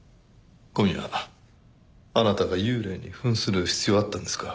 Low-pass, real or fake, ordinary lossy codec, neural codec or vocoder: none; real; none; none